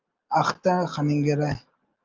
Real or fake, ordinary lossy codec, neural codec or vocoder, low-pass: real; Opus, 24 kbps; none; 7.2 kHz